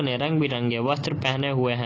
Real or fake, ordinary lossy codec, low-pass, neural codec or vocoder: real; AAC, 32 kbps; 7.2 kHz; none